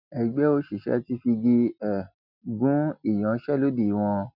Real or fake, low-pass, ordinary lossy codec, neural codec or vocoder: real; 5.4 kHz; none; none